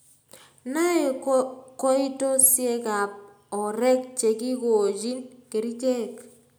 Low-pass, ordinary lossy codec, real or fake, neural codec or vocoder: none; none; real; none